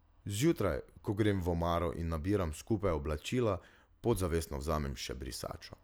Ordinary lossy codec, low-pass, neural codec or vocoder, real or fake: none; none; none; real